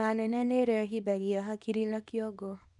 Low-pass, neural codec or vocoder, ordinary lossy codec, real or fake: 10.8 kHz; codec, 24 kHz, 0.9 kbps, WavTokenizer, small release; none; fake